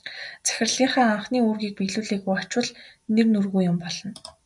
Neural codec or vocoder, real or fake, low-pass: none; real; 10.8 kHz